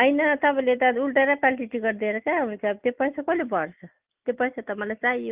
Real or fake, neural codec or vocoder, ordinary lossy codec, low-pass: real; none; Opus, 24 kbps; 3.6 kHz